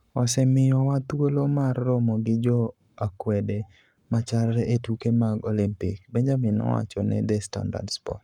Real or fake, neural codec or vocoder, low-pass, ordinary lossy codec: fake; codec, 44.1 kHz, 7.8 kbps, Pupu-Codec; 19.8 kHz; none